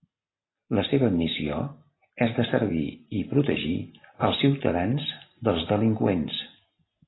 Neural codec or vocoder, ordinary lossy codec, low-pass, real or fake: none; AAC, 16 kbps; 7.2 kHz; real